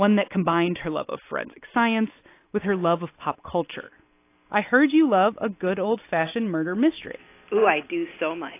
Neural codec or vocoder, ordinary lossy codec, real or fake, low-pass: none; AAC, 24 kbps; real; 3.6 kHz